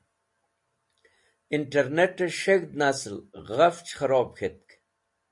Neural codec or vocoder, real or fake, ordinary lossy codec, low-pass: none; real; MP3, 48 kbps; 10.8 kHz